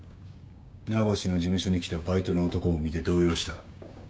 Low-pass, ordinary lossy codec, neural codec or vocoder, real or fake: none; none; codec, 16 kHz, 6 kbps, DAC; fake